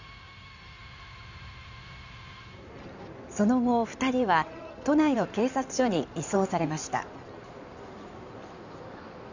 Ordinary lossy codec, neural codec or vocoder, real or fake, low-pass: none; codec, 16 kHz in and 24 kHz out, 2.2 kbps, FireRedTTS-2 codec; fake; 7.2 kHz